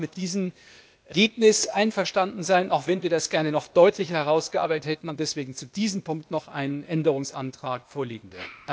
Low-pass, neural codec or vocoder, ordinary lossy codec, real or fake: none; codec, 16 kHz, 0.8 kbps, ZipCodec; none; fake